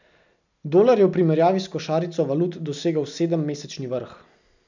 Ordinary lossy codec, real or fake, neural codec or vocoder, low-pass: none; real; none; 7.2 kHz